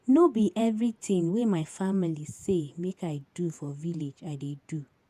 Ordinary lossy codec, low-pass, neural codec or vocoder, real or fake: none; 14.4 kHz; vocoder, 48 kHz, 128 mel bands, Vocos; fake